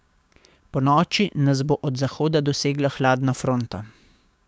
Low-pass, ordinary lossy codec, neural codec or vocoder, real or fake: none; none; codec, 16 kHz, 6 kbps, DAC; fake